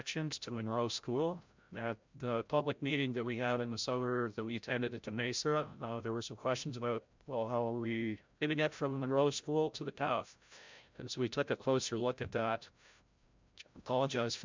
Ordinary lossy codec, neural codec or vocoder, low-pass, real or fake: MP3, 64 kbps; codec, 16 kHz, 0.5 kbps, FreqCodec, larger model; 7.2 kHz; fake